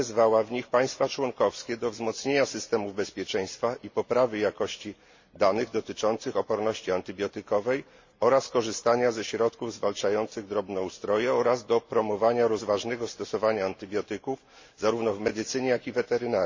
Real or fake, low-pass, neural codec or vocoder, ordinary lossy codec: real; 7.2 kHz; none; MP3, 32 kbps